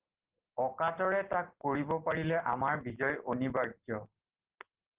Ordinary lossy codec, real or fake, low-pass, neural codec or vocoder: Opus, 16 kbps; real; 3.6 kHz; none